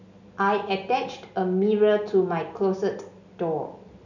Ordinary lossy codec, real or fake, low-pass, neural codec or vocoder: none; real; 7.2 kHz; none